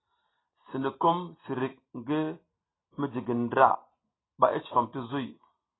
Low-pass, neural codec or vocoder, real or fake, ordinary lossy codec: 7.2 kHz; none; real; AAC, 16 kbps